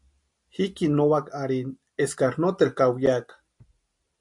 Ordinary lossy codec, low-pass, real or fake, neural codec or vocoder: MP3, 64 kbps; 10.8 kHz; real; none